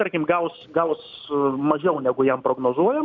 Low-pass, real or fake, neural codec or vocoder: 7.2 kHz; real; none